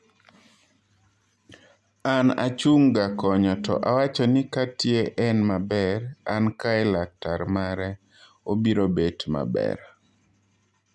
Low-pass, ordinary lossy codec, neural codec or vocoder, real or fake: none; none; none; real